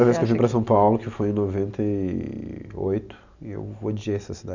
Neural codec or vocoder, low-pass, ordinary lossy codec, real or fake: none; 7.2 kHz; none; real